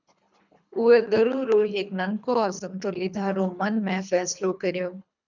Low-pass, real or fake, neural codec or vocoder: 7.2 kHz; fake; codec, 24 kHz, 3 kbps, HILCodec